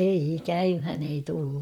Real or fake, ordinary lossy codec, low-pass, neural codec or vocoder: fake; none; 19.8 kHz; vocoder, 44.1 kHz, 128 mel bands, Pupu-Vocoder